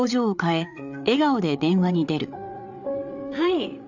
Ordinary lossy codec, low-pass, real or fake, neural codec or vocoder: none; 7.2 kHz; fake; codec, 16 kHz, 8 kbps, FreqCodec, larger model